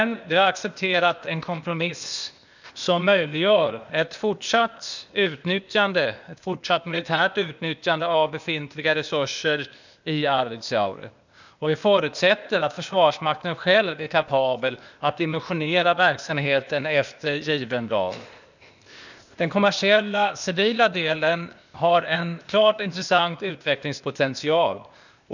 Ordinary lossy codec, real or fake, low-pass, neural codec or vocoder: none; fake; 7.2 kHz; codec, 16 kHz, 0.8 kbps, ZipCodec